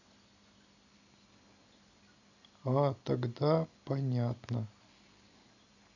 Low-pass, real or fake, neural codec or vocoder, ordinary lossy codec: 7.2 kHz; real; none; none